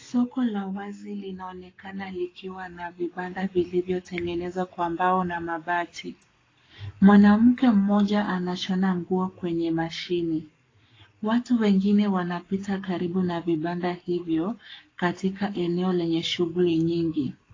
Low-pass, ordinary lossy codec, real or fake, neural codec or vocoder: 7.2 kHz; AAC, 32 kbps; fake; codec, 44.1 kHz, 7.8 kbps, Pupu-Codec